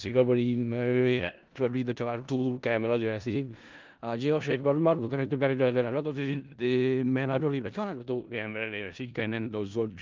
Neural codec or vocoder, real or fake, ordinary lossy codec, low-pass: codec, 16 kHz in and 24 kHz out, 0.4 kbps, LongCat-Audio-Codec, four codebook decoder; fake; Opus, 32 kbps; 7.2 kHz